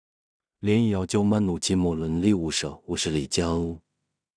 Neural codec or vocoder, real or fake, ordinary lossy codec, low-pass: codec, 16 kHz in and 24 kHz out, 0.4 kbps, LongCat-Audio-Codec, two codebook decoder; fake; none; 9.9 kHz